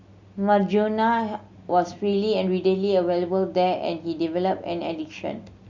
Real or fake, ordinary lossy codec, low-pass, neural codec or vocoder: real; Opus, 64 kbps; 7.2 kHz; none